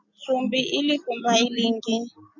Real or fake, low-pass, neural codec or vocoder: real; 7.2 kHz; none